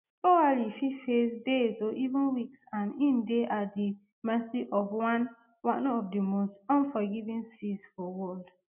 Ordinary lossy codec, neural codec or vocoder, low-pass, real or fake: none; none; 3.6 kHz; real